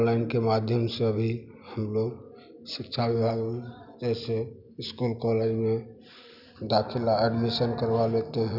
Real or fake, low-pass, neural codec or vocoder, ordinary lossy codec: real; 5.4 kHz; none; none